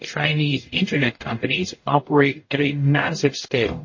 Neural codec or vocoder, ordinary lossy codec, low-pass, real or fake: codec, 44.1 kHz, 0.9 kbps, DAC; MP3, 32 kbps; 7.2 kHz; fake